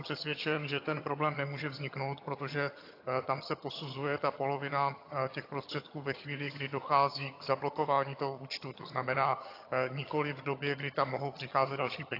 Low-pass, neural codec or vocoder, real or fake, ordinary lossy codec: 5.4 kHz; vocoder, 22.05 kHz, 80 mel bands, HiFi-GAN; fake; AAC, 32 kbps